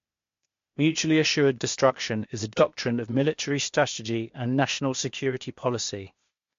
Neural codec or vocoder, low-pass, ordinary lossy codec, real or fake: codec, 16 kHz, 0.8 kbps, ZipCodec; 7.2 kHz; MP3, 48 kbps; fake